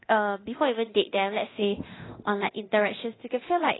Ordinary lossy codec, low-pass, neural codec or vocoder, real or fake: AAC, 16 kbps; 7.2 kHz; codec, 24 kHz, 0.9 kbps, DualCodec; fake